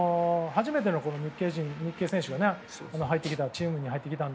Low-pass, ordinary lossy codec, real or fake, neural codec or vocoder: none; none; real; none